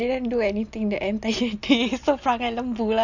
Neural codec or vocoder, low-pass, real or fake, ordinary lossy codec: none; 7.2 kHz; real; none